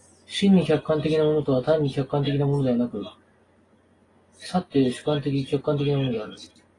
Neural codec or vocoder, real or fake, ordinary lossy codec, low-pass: none; real; AAC, 32 kbps; 10.8 kHz